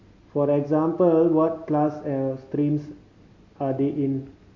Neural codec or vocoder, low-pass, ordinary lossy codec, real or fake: none; 7.2 kHz; MP3, 48 kbps; real